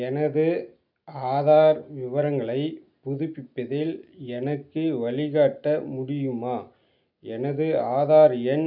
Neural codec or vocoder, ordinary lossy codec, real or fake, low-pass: none; none; real; 5.4 kHz